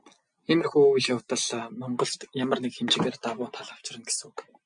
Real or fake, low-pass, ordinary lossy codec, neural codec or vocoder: real; 9.9 kHz; MP3, 64 kbps; none